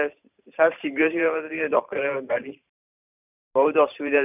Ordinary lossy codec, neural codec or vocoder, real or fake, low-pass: none; vocoder, 44.1 kHz, 128 mel bands every 512 samples, BigVGAN v2; fake; 3.6 kHz